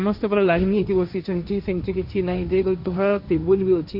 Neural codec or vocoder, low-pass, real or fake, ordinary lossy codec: codec, 16 kHz, 1.1 kbps, Voila-Tokenizer; 5.4 kHz; fake; none